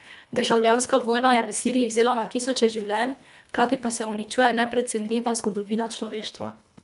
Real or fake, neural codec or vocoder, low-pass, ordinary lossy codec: fake; codec, 24 kHz, 1.5 kbps, HILCodec; 10.8 kHz; none